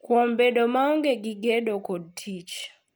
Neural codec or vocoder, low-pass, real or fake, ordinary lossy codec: none; none; real; none